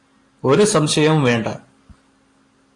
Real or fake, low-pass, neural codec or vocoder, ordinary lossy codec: real; 10.8 kHz; none; AAC, 48 kbps